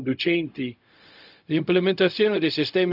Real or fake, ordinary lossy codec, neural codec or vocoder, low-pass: fake; none; codec, 16 kHz, 0.4 kbps, LongCat-Audio-Codec; 5.4 kHz